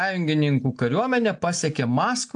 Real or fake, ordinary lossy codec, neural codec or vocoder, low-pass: real; AAC, 64 kbps; none; 9.9 kHz